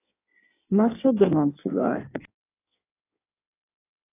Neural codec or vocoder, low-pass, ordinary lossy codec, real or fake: codec, 16 kHz in and 24 kHz out, 1.1 kbps, FireRedTTS-2 codec; 3.6 kHz; AAC, 24 kbps; fake